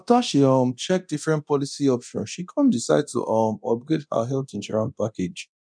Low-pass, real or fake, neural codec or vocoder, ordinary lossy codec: 9.9 kHz; fake; codec, 24 kHz, 0.9 kbps, DualCodec; none